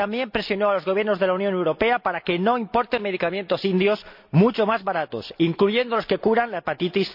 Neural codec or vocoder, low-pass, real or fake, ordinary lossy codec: none; 5.4 kHz; real; MP3, 48 kbps